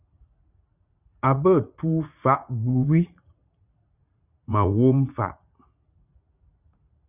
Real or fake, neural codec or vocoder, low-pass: fake; vocoder, 44.1 kHz, 80 mel bands, Vocos; 3.6 kHz